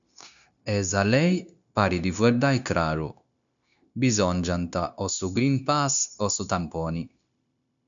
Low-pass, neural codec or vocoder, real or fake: 7.2 kHz; codec, 16 kHz, 0.9 kbps, LongCat-Audio-Codec; fake